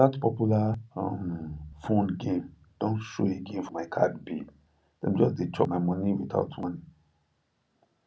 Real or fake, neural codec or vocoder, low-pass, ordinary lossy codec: real; none; none; none